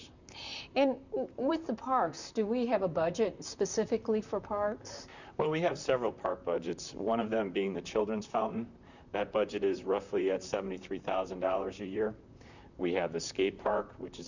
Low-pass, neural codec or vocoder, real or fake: 7.2 kHz; vocoder, 44.1 kHz, 128 mel bands, Pupu-Vocoder; fake